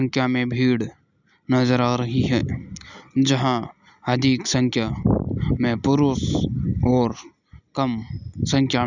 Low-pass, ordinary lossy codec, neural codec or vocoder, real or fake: 7.2 kHz; none; none; real